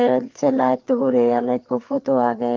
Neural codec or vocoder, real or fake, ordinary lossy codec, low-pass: codec, 16 kHz, 4 kbps, FreqCodec, larger model; fake; Opus, 32 kbps; 7.2 kHz